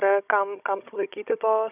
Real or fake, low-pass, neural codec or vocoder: fake; 3.6 kHz; codec, 16 kHz, 16 kbps, FunCodec, trained on Chinese and English, 50 frames a second